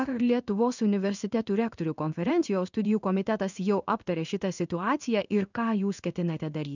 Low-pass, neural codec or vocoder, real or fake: 7.2 kHz; codec, 16 kHz in and 24 kHz out, 1 kbps, XY-Tokenizer; fake